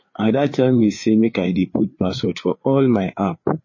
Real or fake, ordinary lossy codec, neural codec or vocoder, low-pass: fake; MP3, 32 kbps; codec, 16 kHz, 4 kbps, FreqCodec, larger model; 7.2 kHz